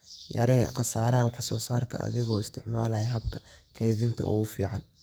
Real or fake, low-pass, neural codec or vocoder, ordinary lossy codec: fake; none; codec, 44.1 kHz, 2.6 kbps, SNAC; none